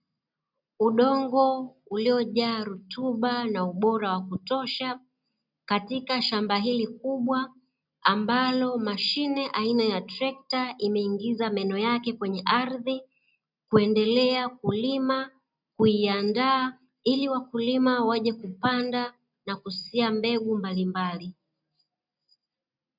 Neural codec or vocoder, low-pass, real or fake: none; 5.4 kHz; real